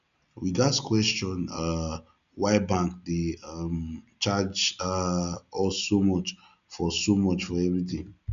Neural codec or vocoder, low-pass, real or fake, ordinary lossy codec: none; 7.2 kHz; real; none